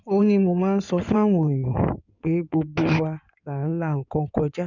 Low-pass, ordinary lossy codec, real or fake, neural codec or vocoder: 7.2 kHz; none; fake; codec, 16 kHz in and 24 kHz out, 2.2 kbps, FireRedTTS-2 codec